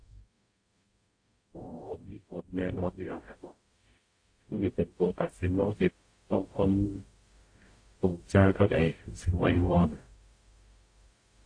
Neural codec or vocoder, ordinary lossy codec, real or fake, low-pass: codec, 44.1 kHz, 0.9 kbps, DAC; AAC, 48 kbps; fake; 9.9 kHz